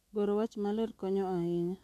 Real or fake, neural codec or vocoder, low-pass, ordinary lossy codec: fake; autoencoder, 48 kHz, 128 numbers a frame, DAC-VAE, trained on Japanese speech; 14.4 kHz; AAC, 64 kbps